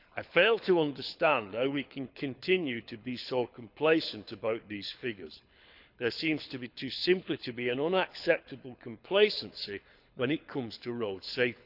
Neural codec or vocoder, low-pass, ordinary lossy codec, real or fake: codec, 24 kHz, 6 kbps, HILCodec; 5.4 kHz; none; fake